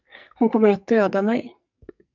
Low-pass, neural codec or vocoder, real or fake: 7.2 kHz; codec, 44.1 kHz, 2.6 kbps, SNAC; fake